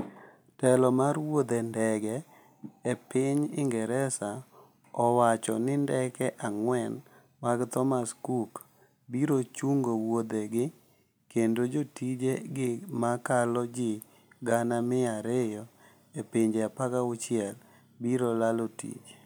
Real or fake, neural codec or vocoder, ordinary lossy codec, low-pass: real; none; none; none